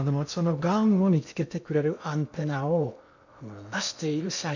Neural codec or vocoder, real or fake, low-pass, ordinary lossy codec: codec, 16 kHz in and 24 kHz out, 0.6 kbps, FocalCodec, streaming, 2048 codes; fake; 7.2 kHz; none